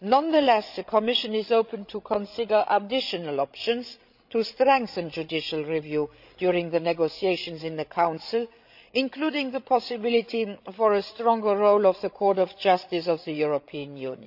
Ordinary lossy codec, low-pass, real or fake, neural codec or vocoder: none; 5.4 kHz; fake; codec, 16 kHz, 16 kbps, FreqCodec, larger model